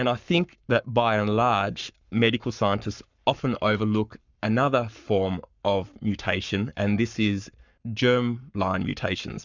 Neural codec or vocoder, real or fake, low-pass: codec, 44.1 kHz, 7.8 kbps, Pupu-Codec; fake; 7.2 kHz